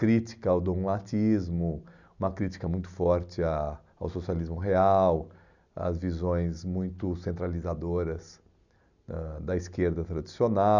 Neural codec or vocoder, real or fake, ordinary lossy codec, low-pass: none; real; none; 7.2 kHz